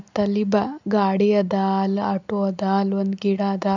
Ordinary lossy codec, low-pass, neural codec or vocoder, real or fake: none; 7.2 kHz; none; real